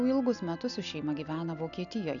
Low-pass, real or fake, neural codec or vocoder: 7.2 kHz; real; none